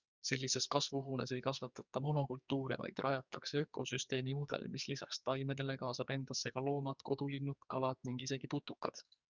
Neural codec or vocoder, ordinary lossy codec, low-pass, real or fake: codec, 44.1 kHz, 2.6 kbps, SNAC; Opus, 64 kbps; 7.2 kHz; fake